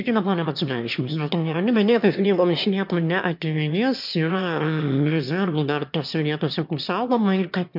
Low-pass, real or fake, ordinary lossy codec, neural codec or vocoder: 5.4 kHz; fake; MP3, 48 kbps; autoencoder, 22.05 kHz, a latent of 192 numbers a frame, VITS, trained on one speaker